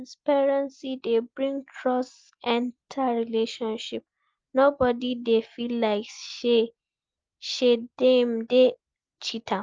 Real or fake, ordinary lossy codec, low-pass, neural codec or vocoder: real; Opus, 24 kbps; 7.2 kHz; none